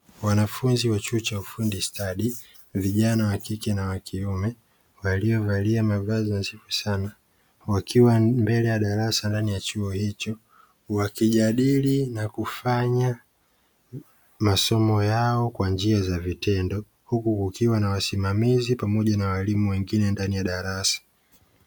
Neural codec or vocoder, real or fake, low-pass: none; real; 19.8 kHz